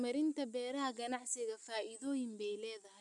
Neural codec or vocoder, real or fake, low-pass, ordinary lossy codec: none; real; 10.8 kHz; none